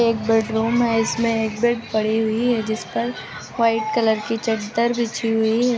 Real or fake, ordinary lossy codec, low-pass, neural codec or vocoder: real; none; none; none